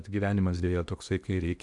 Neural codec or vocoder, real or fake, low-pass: codec, 16 kHz in and 24 kHz out, 0.8 kbps, FocalCodec, streaming, 65536 codes; fake; 10.8 kHz